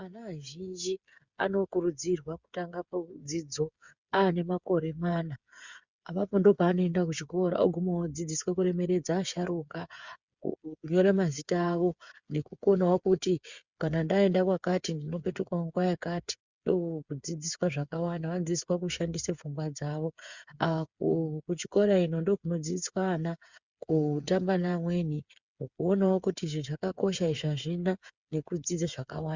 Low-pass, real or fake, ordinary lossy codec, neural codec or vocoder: 7.2 kHz; fake; Opus, 64 kbps; codec, 16 kHz, 8 kbps, FreqCodec, smaller model